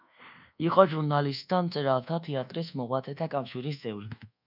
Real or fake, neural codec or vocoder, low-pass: fake; codec, 24 kHz, 1.2 kbps, DualCodec; 5.4 kHz